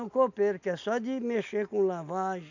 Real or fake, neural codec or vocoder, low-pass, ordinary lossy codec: real; none; 7.2 kHz; none